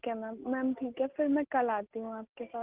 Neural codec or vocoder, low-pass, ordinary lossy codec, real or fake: none; 3.6 kHz; Opus, 16 kbps; real